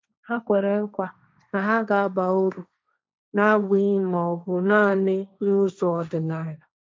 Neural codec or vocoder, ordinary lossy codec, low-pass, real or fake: codec, 16 kHz, 1.1 kbps, Voila-Tokenizer; none; none; fake